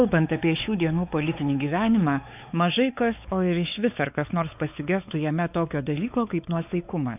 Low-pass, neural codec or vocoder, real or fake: 3.6 kHz; codec, 16 kHz, 4 kbps, X-Codec, WavLM features, trained on Multilingual LibriSpeech; fake